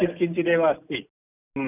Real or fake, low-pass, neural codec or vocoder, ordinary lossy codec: real; 3.6 kHz; none; none